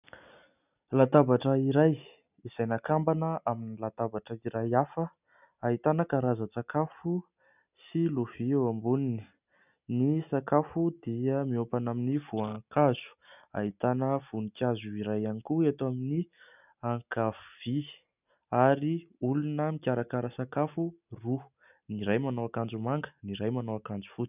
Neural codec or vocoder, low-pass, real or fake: none; 3.6 kHz; real